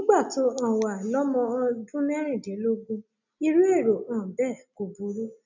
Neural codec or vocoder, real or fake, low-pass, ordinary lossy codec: none; real; 7.2 kHz; none